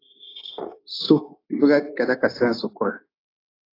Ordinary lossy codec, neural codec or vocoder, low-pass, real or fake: AAC, 32 kbps; codec, 16 kHz, 0.9 kbps, LongCat-Audio-Codec; 5.4 kHz; fake